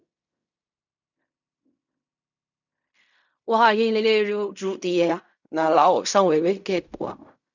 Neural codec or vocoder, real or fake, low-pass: codec, 16 kHz in and 24 kHz out, 0.4 kbps, LongCat-Audio-Codec, fine tuned four codebook decoder; fake; 7.2 kHz